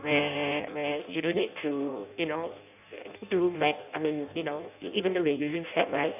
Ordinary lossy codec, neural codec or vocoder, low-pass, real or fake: none; codec, 16 kHz in and 24 kHz out, 0.6 kbps, FireRedTTS-2 codec; 3.6 kHz; fake